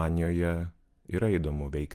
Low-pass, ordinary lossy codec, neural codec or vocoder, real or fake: 14.4 kHz; Opus, 32 kbps; none; real